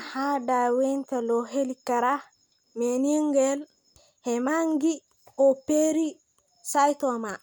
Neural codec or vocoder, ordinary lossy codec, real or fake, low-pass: none; none; real; none